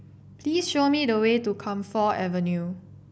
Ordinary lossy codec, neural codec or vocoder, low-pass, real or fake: none; none; none; real